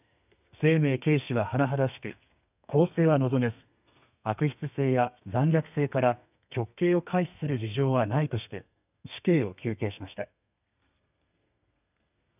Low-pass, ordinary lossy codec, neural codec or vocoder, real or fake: 3.6 kHz; none; codec, 32 kHz, 1.9 kbps, SNAC; fake